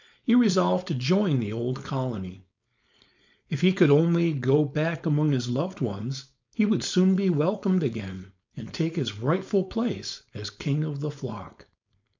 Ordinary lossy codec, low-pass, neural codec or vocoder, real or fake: MP3, 64 kbps; 7.2 kHz; codec, 16 kHz, 4.8 kbps, FACodec; fake